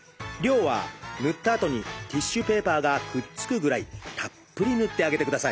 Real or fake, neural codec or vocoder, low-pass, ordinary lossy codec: real; none; none; none